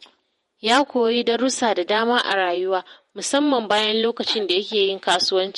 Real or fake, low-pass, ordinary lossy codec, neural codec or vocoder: fake; 19.8 kHz; MP3, 48 kbps; vocoder, 48 kHz, 128 mel bands, Vocos